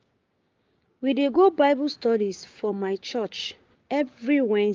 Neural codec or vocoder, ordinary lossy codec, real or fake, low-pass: codec, 16 kHz, 6 kbps, DAC; Opus, 32 kbps; fake; 7.2 kHz